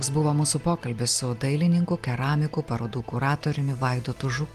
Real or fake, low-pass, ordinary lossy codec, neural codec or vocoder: real; 14.4 kHz; Opus, 24 kbps; none